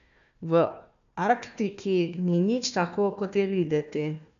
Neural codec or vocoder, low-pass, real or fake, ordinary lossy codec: codec, 16 kHz, 1 kbps, FunCodec, trained on Chinese and English, 50 frames a second; 7.2 kHz; fake; none